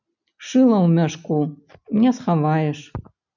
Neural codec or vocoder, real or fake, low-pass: none; real; 7.2 kHz